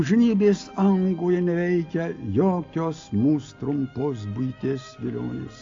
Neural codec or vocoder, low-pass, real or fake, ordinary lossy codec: none; 7.2 kHz; real; AAC, 48 kbps